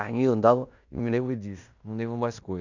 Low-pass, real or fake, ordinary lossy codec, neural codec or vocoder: 7.2 kHz; fake; none; codec, 16 kHz in and 24 kHz out, 0.9 kbps, LongCat-Audio-Codec, fine tuned four codebook decoder